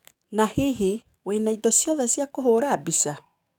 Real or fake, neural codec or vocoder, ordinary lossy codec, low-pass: fake; codec, 44.1 kHz, 7.8 kbps, DAC; none; 19.8 kHz